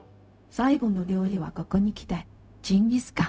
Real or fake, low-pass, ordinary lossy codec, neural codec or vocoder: fake; none; none; codec, 16 kHz, 0.4 kbps, LongCat-Audio-Codec